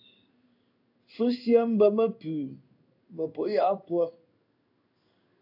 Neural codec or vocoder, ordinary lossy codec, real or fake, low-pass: codec, 16 kHz in and 24 kHz out, 1 kbps, XY-Tokenizer; AAC, 48 kbps; fake; 5.4 kHz